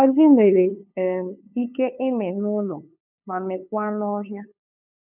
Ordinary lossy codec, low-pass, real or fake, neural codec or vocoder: none; 3.6 kHz; fake; codec, 16 kHz, 2 kbps, FunCodec, trained on LibriTTS, 25 frames a second